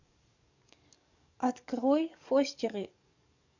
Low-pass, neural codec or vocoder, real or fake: 7.2 kHz; codec, 44.1 kHz, 7.8 kbps, DAC; fake